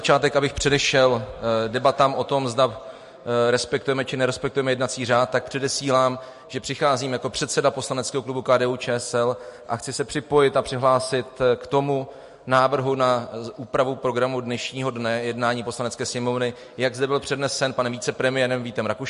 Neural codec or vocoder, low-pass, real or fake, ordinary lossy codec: none; 14.4 kHz; real; MP3, 48 kbps